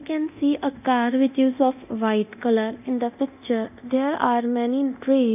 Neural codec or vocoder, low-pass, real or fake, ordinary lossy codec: codec, 24 kHz, 0.9 kbps, DualCodec; 3.6 kHz; fake; none